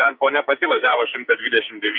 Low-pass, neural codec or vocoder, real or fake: 5.4 kHz; codec, 44.1 kHz, 2.6 kbps, SNAC; fake